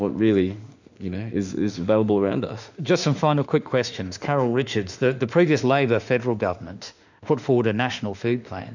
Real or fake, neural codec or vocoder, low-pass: fake; autoencoder, 48 kHz, 32 numbers a frame, DAC-VAE, trained on Japanese speech; 7.2 kHz